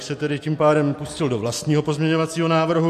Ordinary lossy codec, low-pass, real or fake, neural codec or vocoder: MP3, 64 kbps; 14.4 kHz; real; none